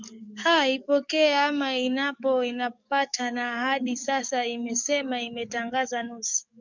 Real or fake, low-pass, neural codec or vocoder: fake; 7.2 kHz; codec, 44.1 kHz, 7.8 kbps, DAC